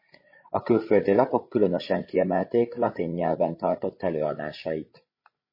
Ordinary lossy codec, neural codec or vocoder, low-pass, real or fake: MP3, 24 kbps; codec, 16 kHz, 16 kbps, FreqCodec, larger model; 5.4 kHz; fake